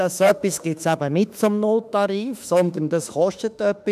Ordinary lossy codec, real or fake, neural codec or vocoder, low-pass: none; fake; autoencoder, 48 kHz, 32 numbers a frame, DAC-VAE, trained on Japanese speech; 14.4 kHz